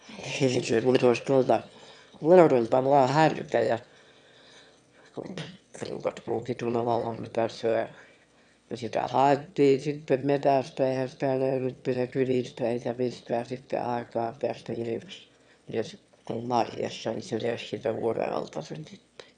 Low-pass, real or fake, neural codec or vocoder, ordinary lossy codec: 9.9 kHz; fake; autoencoder, 22.05 kHz, a latent of 192 numbers a frame, VITS, trained on one speaker; none